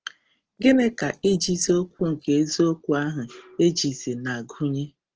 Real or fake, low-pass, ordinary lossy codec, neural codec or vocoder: real; 7.2 kHz; Opus, 16 kbps; none